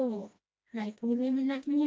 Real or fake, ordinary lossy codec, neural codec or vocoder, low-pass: fake; none; codec, 16 kHz, 1 kbps, FreqCodec, smaller model; none